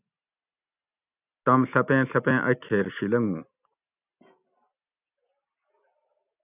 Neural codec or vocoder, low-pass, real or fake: none; 3.6 kHz; real